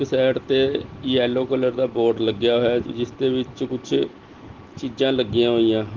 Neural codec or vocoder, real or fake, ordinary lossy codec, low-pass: none; real; Opus, 16 kbps; 7.2 kHz